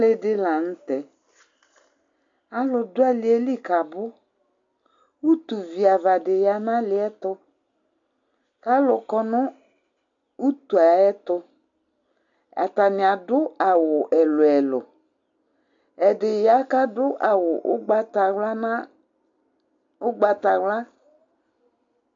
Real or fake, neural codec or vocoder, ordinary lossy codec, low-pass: real; none; AAC, 64 kbps; 7.2 kHz